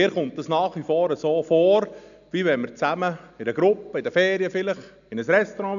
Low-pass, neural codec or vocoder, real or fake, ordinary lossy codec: 7.2 kHz; none; real; none